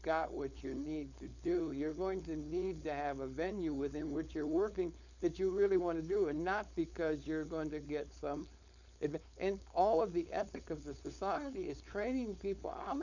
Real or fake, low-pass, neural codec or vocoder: fake; 7.2 kHz; codec, 16 kHz, 4.8 kbps, FACodec